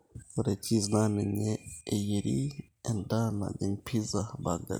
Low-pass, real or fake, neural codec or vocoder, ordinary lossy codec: none; real; none; none